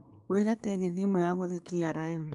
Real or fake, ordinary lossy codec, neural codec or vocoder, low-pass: fake; none; codec, 24 kHz, 1 kbps, SNAC; 10.8 kHz